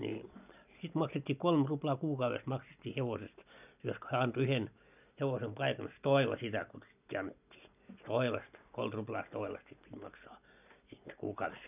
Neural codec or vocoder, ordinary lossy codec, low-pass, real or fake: none; none; 3.6 kHz; real